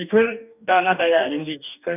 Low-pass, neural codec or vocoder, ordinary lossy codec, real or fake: 3.6 kHz; codec, 44.1 kHz, 2.6 kbps, DAC; none; fake